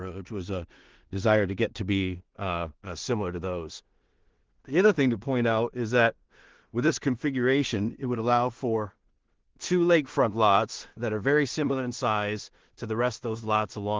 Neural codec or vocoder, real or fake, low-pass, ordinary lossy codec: codec, 16 kHz in and 24 kHz out, 0.4 kbps, LongCat-Audio-Codec, two codebook decoder; fake; 7.2 kHz; Opus, 16 kbps